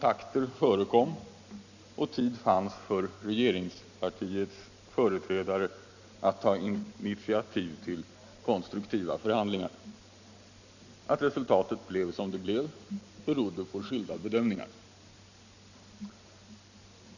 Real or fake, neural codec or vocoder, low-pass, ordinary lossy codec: real; none; 7.2 kHz; none